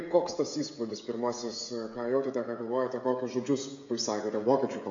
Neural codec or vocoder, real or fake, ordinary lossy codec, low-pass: codec, 16 kHz, 16 kbps, FreqCodec, smaller model; fake; AAC, 48 kbps; 7.2 kHz